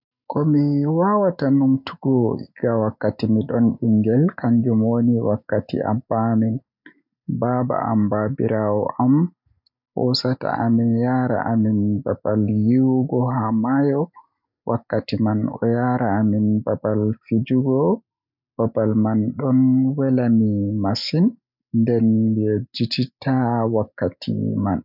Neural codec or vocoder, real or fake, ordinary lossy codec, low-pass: none; real; none; 5.4 kHz